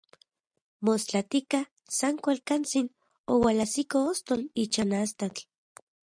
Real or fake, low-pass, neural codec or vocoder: real; 9.9 kHz; none